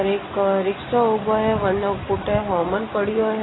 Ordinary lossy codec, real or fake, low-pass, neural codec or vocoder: AAC, 16 kbps; real; 7.2 kHz; none